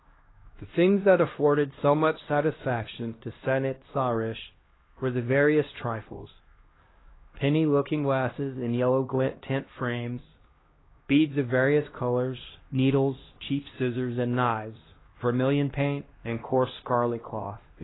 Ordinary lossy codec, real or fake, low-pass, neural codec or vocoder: AAC, 16 kbps; fake; 7.2 kHz; codec, 16 kHz, 1 kbps, X-Codec, HuBERT features, trained on LibriSpeech